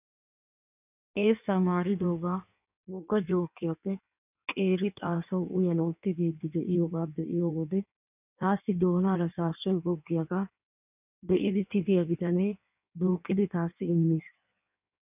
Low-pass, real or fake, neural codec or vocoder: 3.6 kHz; fake; codec, 16 kHz in and 24 kHz out, 1.1 kbps, FireRedTTS-2 codec